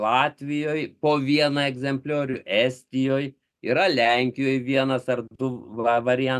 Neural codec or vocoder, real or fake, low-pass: none; real; 14.4 kHz